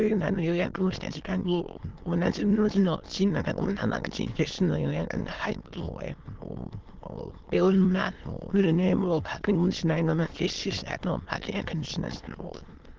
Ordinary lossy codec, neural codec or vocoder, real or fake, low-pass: Opus, 16 kbps; autoencoder, 22.05 kHz, a latent of 192 numbers a frame, VITS, trained on many speakers; fake; 7.2 kHz